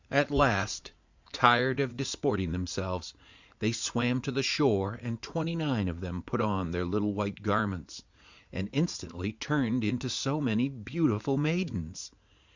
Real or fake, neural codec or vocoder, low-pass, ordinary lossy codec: fake; vocoder, 44.1 kHz, 80 mel bands, Vocos; 7.2 kHz; Opus, 64 kbps